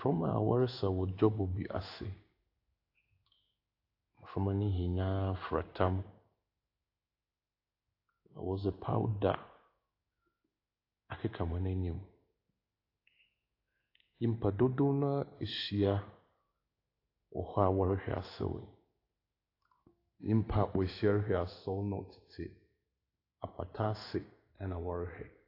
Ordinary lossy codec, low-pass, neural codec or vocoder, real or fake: AAC, 32 kbps; 5.4 kHz; codec, 16 kHz in and 24 kHz out, 1 kbps, XY-Tokenizer; fake